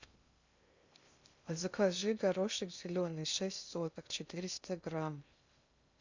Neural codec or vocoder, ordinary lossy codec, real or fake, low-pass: codec, 16 kHz in and 24 kHz out, 0.6 kbps, FocalCodec, streaming, 2048 codes; Opus, 64 kbps; fake; 7.2 kHz